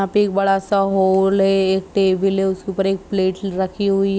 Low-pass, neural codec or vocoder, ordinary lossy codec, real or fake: none; none; none; real